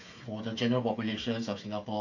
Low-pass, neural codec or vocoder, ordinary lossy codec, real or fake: 7.2 kHz; codec, 16 kHz, 8 kbps, FreqCodec, smaller model; AAC, 48 kbps; fake